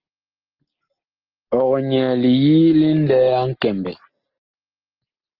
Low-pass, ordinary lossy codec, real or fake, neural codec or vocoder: 5.4 kHz; Opus, 16 kbps; real; none